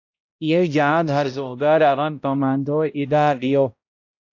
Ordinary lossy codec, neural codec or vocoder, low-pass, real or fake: AAC, 48 kbps; codec, 16 kHz, 0.5 kbps, X-Codec, HuBERT features, trained on balanced general audio; 7.2 kHz; fake